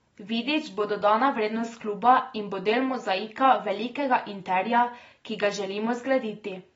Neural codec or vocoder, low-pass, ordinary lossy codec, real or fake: none; 19.8 kHz; AAC, 24 kbps; real